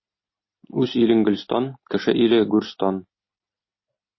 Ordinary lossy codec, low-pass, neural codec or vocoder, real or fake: MP3, 24 kbps; 7.2 kHz; none; real